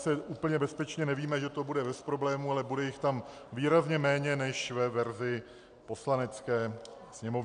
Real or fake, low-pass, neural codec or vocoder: real; 9.9 kHz; none